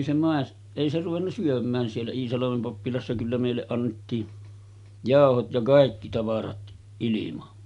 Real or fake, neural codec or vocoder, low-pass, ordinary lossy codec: real; none; 10.8 kHz; none